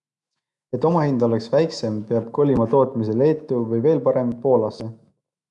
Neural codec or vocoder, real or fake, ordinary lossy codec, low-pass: autoencoder, 48 kHz, 128 numbers a frame, DAC-VAE, trained on Japanese speech; fake; MP3, 96 kbps; 10.8 kHz